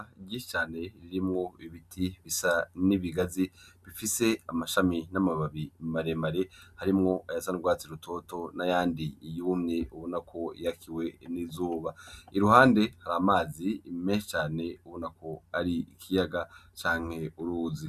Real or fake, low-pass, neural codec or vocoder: real; 14.4 kHz; none